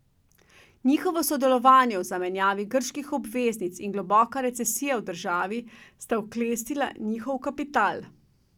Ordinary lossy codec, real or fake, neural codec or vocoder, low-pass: none; real; none; 19.8 kHz